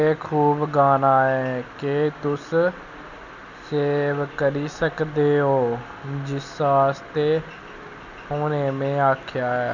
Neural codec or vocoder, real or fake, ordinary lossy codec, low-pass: none; real; none; 7.2 kHz